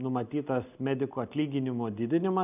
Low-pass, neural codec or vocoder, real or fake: 3.6 kHz; none; real